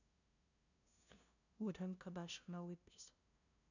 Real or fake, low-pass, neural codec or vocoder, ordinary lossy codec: fake; 7.2 kHz; codec, 16 kHz, 0.5 kbps, FunCodec, trained on LibriTTS, 25 frames a second; none